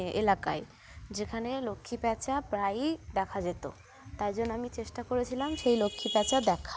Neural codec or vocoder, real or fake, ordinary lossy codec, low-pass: none; real; none; none